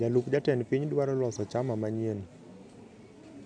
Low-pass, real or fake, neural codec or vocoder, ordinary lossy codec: 9.9 kHz; real; none; none